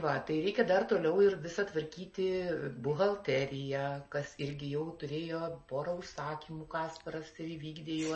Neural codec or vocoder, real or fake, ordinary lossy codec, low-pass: none; real; MP3, 32 kbps; 10.8 kHz